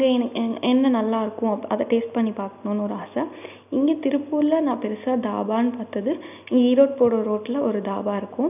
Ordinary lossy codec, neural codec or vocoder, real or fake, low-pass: none; none; real; 3.6 kHz